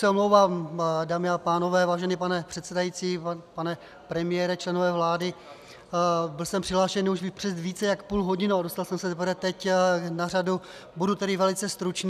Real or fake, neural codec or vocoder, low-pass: real; none; 14.4 kHz